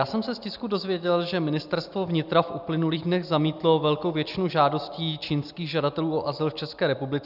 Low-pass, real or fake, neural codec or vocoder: 5.4 kHz; real; none